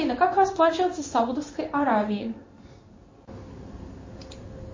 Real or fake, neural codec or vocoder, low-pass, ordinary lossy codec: fake; codec, 16 kHz in and 24 kHz out, 1 kbps, XY-Tokenizer; 7.2 kHz; MP3, 32 kbps